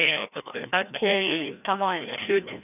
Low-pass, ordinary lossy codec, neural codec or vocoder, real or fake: 3.6 kHz; none; codec, 16 kHz, 1 kbps, FreqCodec, larger model; fake